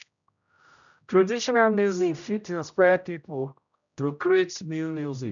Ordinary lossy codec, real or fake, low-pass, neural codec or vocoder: none; fake; 7.2 kHz; codec, 16 kHz, 0.5 kbps, X-Codec, HuBERT features, trained on general audio